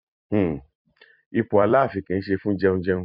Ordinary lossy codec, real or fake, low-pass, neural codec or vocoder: none; fake; 5.4 kHz; vocoder, 44.1 kHz, 128 mel bands every 256 samples, BigVGAN v2